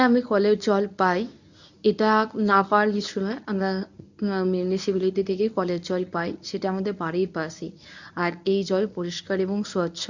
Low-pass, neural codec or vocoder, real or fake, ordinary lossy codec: 7.2 kHz; codec, 24 kHz, 0.9 kbps, WavTokenizer, medium speech release version 1; fake; none